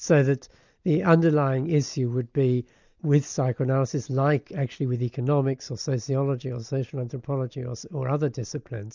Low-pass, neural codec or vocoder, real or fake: 7.2 kHz; none; real